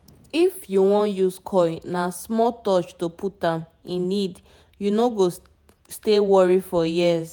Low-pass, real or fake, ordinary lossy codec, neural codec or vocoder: none; fake; none; vocoder, 48 kHz, 128 mel bands, Vocos